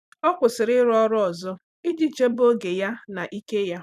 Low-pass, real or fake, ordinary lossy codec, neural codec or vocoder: 14.4 kHz; fake; none; vocoder, 44.1 kHz, 128 mel bands every 256 samples, BigVGAN v2